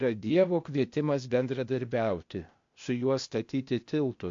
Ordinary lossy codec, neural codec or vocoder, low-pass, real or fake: MP3, 48 kbps; codec, 16 kHz, 0.8 kbps, ZipCodec; 7.2 kHz; fake